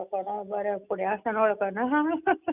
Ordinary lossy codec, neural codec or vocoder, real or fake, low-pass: none; none; real; 3.6 kHz